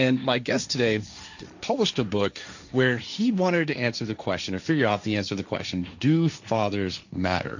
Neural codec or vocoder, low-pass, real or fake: codec, 16 kHz, 1.1 kbps, Voila-Tokenizer; 7.2 kHz; fake